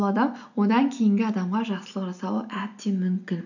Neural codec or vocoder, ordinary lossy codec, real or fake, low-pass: none; none; real; 7.2 kHz